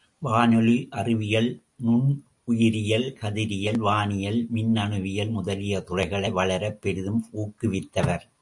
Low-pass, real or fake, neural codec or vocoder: 10.8 kHz; real; none